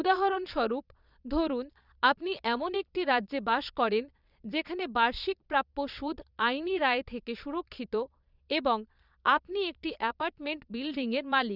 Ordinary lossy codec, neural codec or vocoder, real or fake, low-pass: none; vocoder, 22.05 kHz, 80 mel bands, Vocos; fake; 5.4 kHz